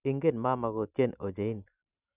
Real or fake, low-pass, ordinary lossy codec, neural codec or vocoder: real; 3.6 kHz; none; none